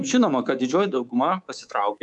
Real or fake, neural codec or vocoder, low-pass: fake; codec, 24 kHz, 3.1 kbps, DualCodec; 10.8 kHz